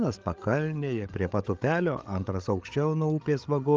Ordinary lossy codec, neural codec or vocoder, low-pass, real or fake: Opus, 24 kbps; codec, 16 kHz, 8 kbps, FreqCodec, larger model; 7.2 kHz; fake